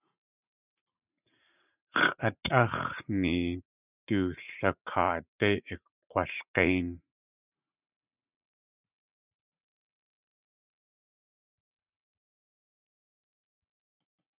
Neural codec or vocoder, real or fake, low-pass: vocoder, 44.1 kHz, 80 mel bands, Vocos; fake; 3.6 kHz